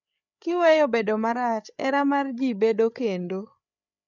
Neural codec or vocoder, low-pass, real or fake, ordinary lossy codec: codec, 16 kHz, 8 kbps, FreqCodec, larger model; 7.2 kHz; fake; none